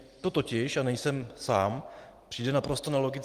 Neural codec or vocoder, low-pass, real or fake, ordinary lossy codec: none; 14.4 kHz; real; Opus, 24 kbps